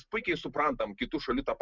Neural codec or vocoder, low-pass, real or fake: none; 7.2 kHz; real